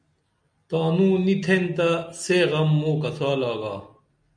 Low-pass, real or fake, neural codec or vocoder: 9.9 kHz; real; none